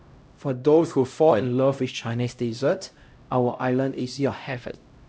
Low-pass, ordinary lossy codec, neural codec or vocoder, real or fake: none; none; codec, 16 kHz, 0.5 kbps, X-Codec, HuBERT features, trained on LibriSpeech; fake